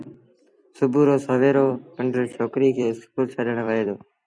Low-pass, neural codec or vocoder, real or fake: 9.9 kHz; vocoder, 44.1 kHz, 128 mel bands every 256 samples, BigVGAN v2; fake